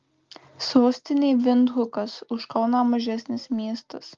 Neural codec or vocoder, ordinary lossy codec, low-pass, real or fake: none; Opus, 32 kbps; 7.2 kHz; real